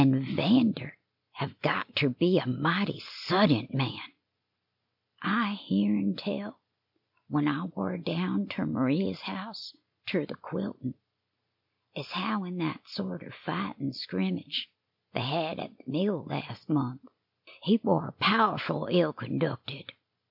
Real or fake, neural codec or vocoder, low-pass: real; none; 5.4 kHz